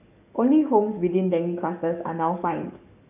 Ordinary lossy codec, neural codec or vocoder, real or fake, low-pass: none; codec, 44.1 kHz, 7.8 kbps, DAC; fake; 3.6 kHz